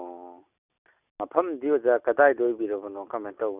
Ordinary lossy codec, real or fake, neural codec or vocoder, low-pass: none; real; none; 3.6 kHz